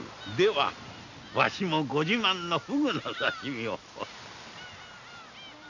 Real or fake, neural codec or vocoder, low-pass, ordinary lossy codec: real; none; 7.2 kHz; none